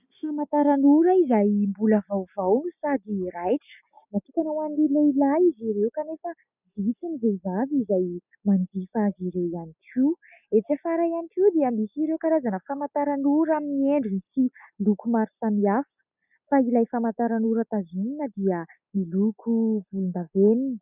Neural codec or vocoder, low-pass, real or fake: none; 3.6 kHz; real